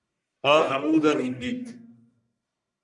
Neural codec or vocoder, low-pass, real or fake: codec, 44.1 kHz, 1.7 kbps, Pupu-Codec; 10.8 kHz; fake